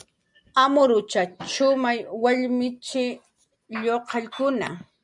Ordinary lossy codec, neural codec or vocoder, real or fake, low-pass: MP3, 96 kbps; none; real; 10.8 kHz